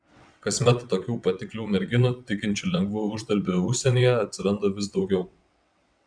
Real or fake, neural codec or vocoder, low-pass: fake; vocoder, 22.05 kHz, 80 mel bands, WaveNeXt; 9.9 kHz